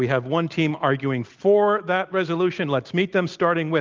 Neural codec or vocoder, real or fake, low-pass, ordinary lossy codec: none; real; 7.2 kHz; Opus, 24 kbps